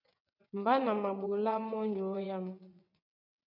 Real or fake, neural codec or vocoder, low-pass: fake; vocoder, 22.05 kHz, 80 mel bands, WaveNeXt; 5.4 kHz